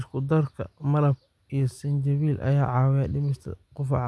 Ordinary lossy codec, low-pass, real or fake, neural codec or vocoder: none; none; real; none